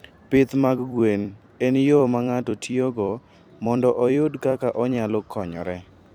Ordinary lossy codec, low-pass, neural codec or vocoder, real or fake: none; 19.8 kHz; vocoder, 44.1 kHz, 128 mel bands every 256 samples, BigVGAN v2; fake